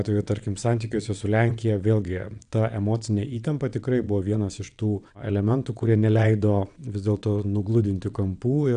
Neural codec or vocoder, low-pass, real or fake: vocoder, 22.05 kHz, 80 mel bands, WaveNeXt; 9.9 kHz; fake